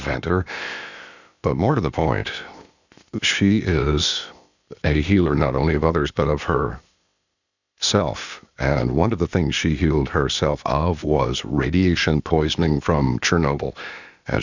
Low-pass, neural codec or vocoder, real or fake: 7.2 kHz; codec, 16 kHz, 0.8 kbps, ZipCodec; fake